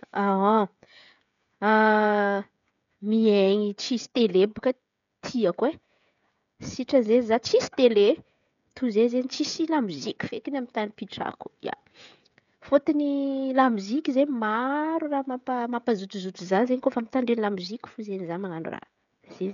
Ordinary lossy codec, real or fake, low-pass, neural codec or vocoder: none; fake; 7.2 kHz; codec, 16 kHz, 16 kbps, FreqCodec, smaller model